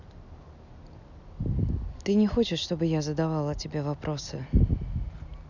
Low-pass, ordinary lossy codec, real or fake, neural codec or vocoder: 7.2 kHz; none; real; none